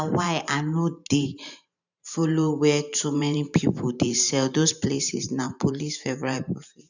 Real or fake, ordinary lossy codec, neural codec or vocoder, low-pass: fake; none; vocoder, 44.1 kHz, 128 mel bands every 512 samples, BigVGAN v2; 7.2 kHz